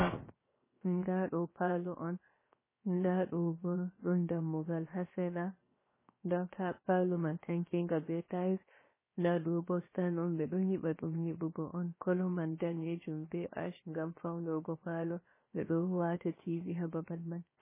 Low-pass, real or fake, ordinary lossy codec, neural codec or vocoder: 3.6 kHz; fake; MP3, 16 kbps; codec, 16 kHz, 0.7 kbps, FocalCodec